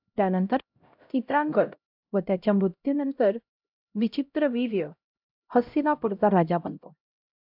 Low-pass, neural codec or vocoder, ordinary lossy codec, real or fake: 5.4 kHz; codec, 16 kHz, 0.5 kbps, X-Codec, HuBERT features, trained on LibriSpeech; AAC, 48 kbps; fake